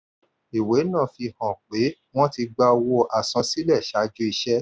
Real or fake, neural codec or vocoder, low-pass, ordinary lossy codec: real; none; none; none